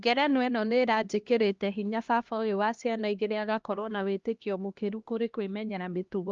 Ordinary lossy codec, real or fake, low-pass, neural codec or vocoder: Opus, 32 kbps; fake; 7.2 kHz; codec, 16 kHz, 1 kbps, X-Codec, HuBERT features, trained on LibriSpeech